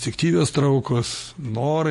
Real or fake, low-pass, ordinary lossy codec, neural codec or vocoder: real; 14.4 kHz; MP3, 48 kbps; none